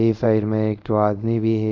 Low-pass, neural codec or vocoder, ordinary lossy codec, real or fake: 7.2 kHz; codec, 24 kHz, 0.5 kbps, DualCodec; none; fake